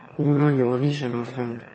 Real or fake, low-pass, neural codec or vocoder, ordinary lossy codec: fake; 9.9 kHz; autoencoder, 22.05 kHz, a latent of 192 numbers a frame, VITS, trained on one speaker; MP3, 32 kbps